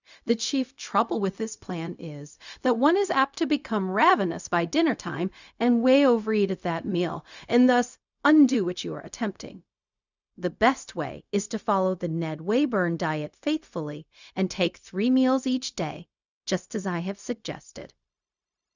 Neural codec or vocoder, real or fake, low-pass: codec, 16 kHz, 0.4 kbps, LongCat-Audio-Codec; fake; 7.2 kHz